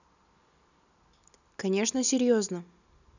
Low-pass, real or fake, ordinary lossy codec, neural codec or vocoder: 7.2 kHz; real; none; none